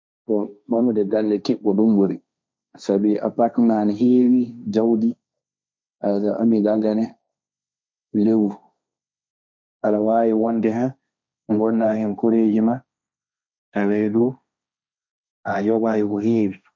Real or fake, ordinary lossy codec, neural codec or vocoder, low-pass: fake; none; codec, 16 kHz, 1.1 kbps, Voila-Tokenizer; none